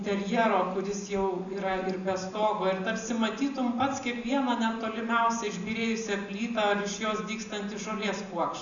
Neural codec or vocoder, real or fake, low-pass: none; real; 7.2 kHz